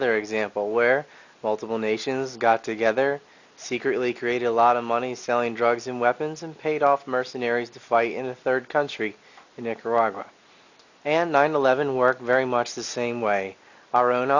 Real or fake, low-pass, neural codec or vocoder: real; 7.2 kHz; none